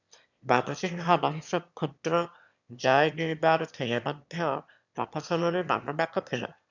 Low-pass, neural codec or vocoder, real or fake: 7.2 kHz; autoencoder, 22.05 kHz, a latent of 192 numbers a frame, VITS, trained on one speaker; fake